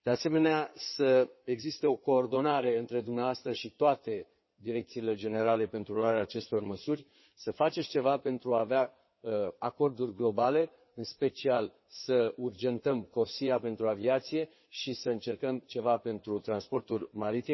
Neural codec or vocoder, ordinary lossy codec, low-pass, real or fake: codec, 16 kHz in and 24 kHz out, 2.2 kbps, FireRedTTS-2 codec; MP3, 24 kbps; 7.2 kHz; fake